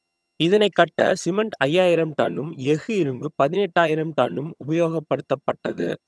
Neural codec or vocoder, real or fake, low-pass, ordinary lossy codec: vocoder, 22.05 kHz, 80 mel bands, HiFi-GAN; fake; none; none